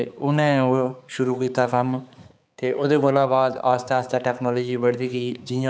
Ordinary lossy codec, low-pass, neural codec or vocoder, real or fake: none; none; codec, 16 kHz, 4 kbps, X-Codec, HuBERT features, trained on balanced general audio; fake